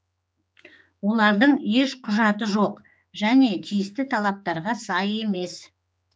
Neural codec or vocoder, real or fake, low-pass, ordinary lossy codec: codec, 16 kHz, 4 kbps, X-Codec, HuBERT features, trained on general audio; fake; none; none